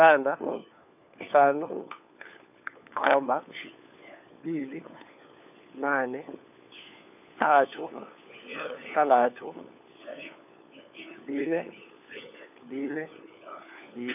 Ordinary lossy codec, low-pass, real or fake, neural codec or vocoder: none; 3.6 kHz; fake; codec, 16 kHz, 4 kbps, FunCodec, trained on LibriTTS, 50 frames a second